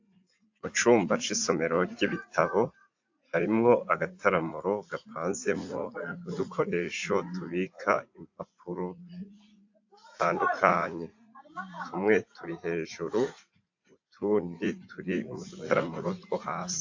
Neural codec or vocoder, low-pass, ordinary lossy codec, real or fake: vocoder, 44.1 kHz, 80 mel bands, Vocos; 7.2 kHz; AAC, 48 kbps; fake